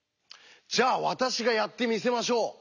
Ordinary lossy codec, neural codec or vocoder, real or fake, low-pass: none; none; real; 7.2 kHz